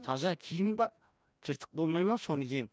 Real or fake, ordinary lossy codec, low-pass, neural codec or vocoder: fake; none; none; codec, 16 kHz, 1 kbps, FreqCodec, larger model